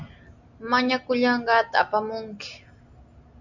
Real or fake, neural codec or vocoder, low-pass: real; none; 7.2 kHz